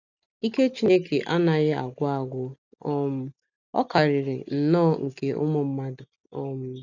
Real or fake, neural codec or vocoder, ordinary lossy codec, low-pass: real; none; none; 7.2 kHz